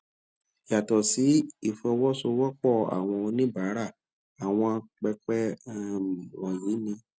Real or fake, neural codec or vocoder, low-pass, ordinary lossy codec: real; none; none; none